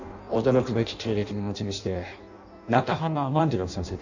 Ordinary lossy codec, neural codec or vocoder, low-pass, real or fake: none; codec, 16 kHz in and 24 kHz out, 0.6 kbps, FireRedTTS-2 codec; 7.2 kHz; fake